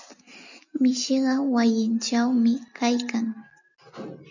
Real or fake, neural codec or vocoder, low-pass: real; none; 7.2 kHz